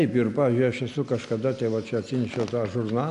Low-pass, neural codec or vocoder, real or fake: 10.8 kHz; none; real